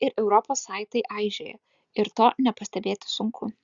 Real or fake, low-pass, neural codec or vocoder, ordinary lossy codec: real; 7.2 kHz; none; Opus, 64 kbps